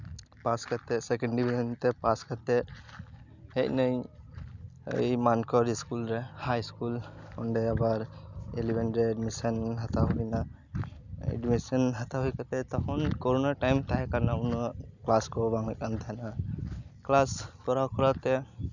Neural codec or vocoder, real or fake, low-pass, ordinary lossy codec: none; real; 7.2 kHz; none